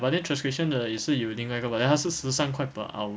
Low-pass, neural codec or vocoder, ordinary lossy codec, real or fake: none; none; none; real